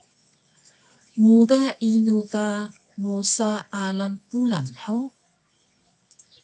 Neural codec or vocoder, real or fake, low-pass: codec, 24 kHz, 0.9 kbps, WavTokenizer, medium music audio release; fake; 10.8 kHz